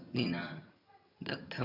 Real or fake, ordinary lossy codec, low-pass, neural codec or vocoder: fake; none; 5.4 kHz; vocoder, 22.05 kHz, 80 mel bands, HiFi-GAN